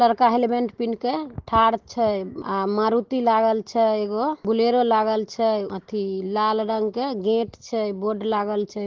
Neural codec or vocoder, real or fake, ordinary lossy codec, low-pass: none; real; Opus, 16 kbps; 7.2 kHz